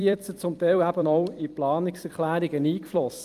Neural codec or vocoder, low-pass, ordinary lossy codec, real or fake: vocoder, 44.1 kHz, 128 mel bands every 256 samples, BigVGAN v2; 14.4 kHz; Opus, 32 kbps; fake